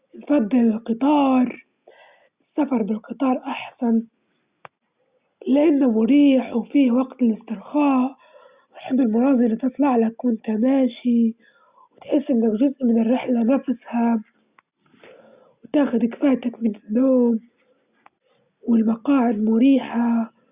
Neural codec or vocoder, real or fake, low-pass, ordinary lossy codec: none; real; 3.6 kHz; Opus, 64 kbps